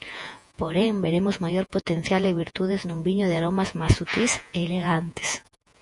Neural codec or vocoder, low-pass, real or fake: vocoder, 48 kHz, 128 mel bands, Vocos; 10.8 kHz; fake